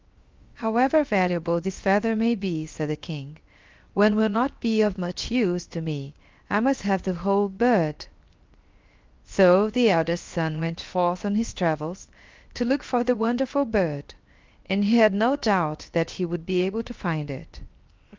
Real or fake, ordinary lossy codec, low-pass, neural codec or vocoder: fake; Opus, 32 kbps; 7.2 kHz; codec, 16 kHz, 0.7 kbps, FocalCodec